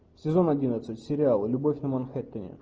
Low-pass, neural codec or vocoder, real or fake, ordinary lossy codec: 7.2 kHz; none; real; Opus, 24 kbps